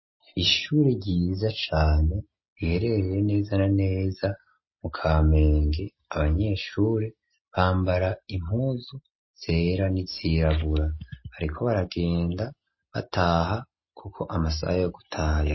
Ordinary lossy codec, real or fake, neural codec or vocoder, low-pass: MP3, 24 kbps; real; none; 7.2 kHz